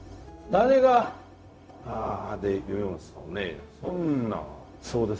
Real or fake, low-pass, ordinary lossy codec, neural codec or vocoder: fake; none; none; codec, 16 kHz, 0.4 kbps, LongCat-Audio-Codec